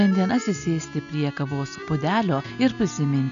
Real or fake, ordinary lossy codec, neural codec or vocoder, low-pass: real; AAC, 96 kbps; none; 7.2 kHz